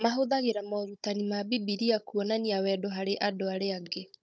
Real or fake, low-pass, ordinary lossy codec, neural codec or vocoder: fake; none; none; codec, 16 kHz, 16 kbps, FunCodec, trained on Chinese and English, 50 frames a second